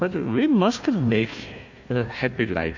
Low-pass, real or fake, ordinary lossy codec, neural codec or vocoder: 7.2 kHz; fake; AAC, 48 kbps; codec, 16 kHz, 1 kbps, FunCodec, trained on Chinese and English, 50 frames a second